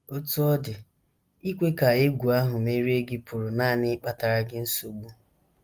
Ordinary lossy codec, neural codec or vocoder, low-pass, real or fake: Opus, 32 kbps; none; 14.4 kHz; real